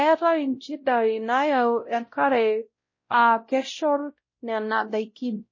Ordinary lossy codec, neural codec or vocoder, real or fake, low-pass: MP3, 32 kbps; codec, 16 kHz, 0.5 kbps, X-Codec, WavLM features, trained on Multilingual LibriSpeech; fake; 7.2 kHz